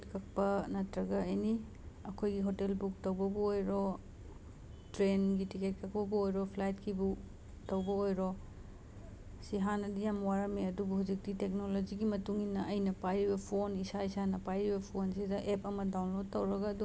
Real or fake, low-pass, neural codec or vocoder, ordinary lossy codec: real; none; none; none